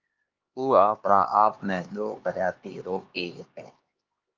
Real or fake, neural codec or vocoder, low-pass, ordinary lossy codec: fake; codec, 16 kHz, 2 kbps, X-Codec, HuBERT features, trained on LibriSpeech; 7.2 kHz; Opus, 24 kbps